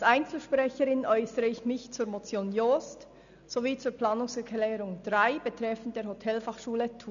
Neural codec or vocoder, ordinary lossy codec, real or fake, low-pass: none; none; real; 7.2 kHz